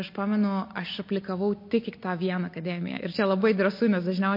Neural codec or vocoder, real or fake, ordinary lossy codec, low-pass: none; real; MP3, 32 kbps; 5.4 kHz